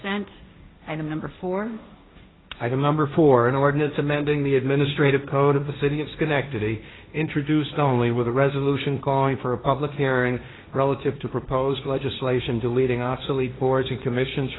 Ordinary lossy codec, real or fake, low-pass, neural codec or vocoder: AAC, 16 kbps; fake; 7.2 kHz; codec, 16 kHz, 1.1 kbps, Voila-Tokenizer